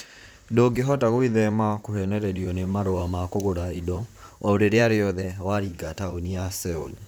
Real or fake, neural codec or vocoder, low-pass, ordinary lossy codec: fake; vocoder, 44.1 kHz, 128 mel bands, Pupu-Vocoder; none; none